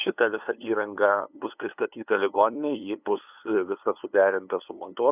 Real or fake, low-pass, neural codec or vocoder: fake; 3.6 kHz; codec, 16 kHz, 2 kbps, FunCodec, trained on LibriTTS, 25 frames a second